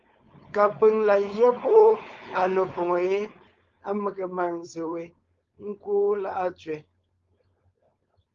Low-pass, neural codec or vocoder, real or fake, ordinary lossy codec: 7.2 kHz; codec, 16 kHz, 4.8 kbps, FACodec; fake; Opus, 32 kbps